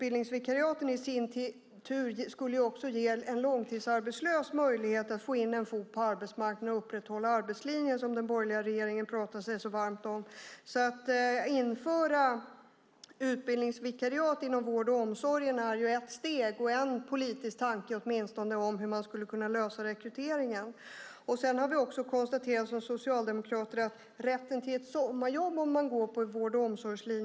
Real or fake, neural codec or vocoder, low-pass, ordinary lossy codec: real; none; none; none